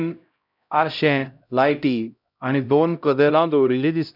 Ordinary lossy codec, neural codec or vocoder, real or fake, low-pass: none; codec, 16 kHz, 0.5 kbps, X-Codec, HuBERT features, trained on LibriSpeech; fake; 5.4 kHz